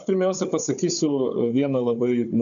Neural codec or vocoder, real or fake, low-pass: codec, 16 kHz, 4 kbps, FunCodec, trained on Chinese and English, 50 frames a second; fake; 7.2 kHz